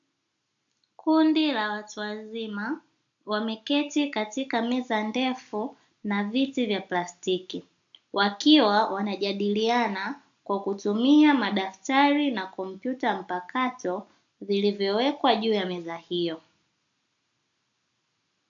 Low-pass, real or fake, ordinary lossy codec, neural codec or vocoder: 7.2 kHz; real; AAC, 64 kbps; none